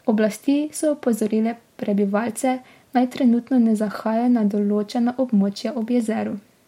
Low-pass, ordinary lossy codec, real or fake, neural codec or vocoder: 19.8 kHz; MP3, 64 kbps; real; none